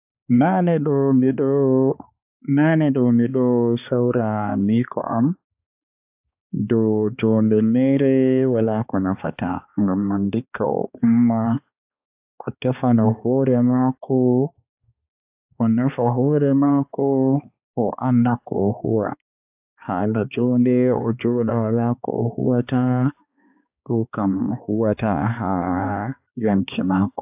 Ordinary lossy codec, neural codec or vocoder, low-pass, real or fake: AAC, 32 kbps; codec, 16 kHz, 2 kbps, X-Codec, HuBERT features, trained on balanced general audio; 3.6 kHz; fake